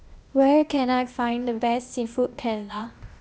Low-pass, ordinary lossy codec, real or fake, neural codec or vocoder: none; none; fake; codec, 16 kHz, 0.8 kbps, ZipCodec